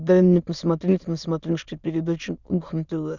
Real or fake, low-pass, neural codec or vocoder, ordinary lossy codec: fake; 7.2 kHz; autoencoder, 22.05 kHz, a latent of 192 numbers a frame, VITS, trained on many speakers; Opus, 64 kbps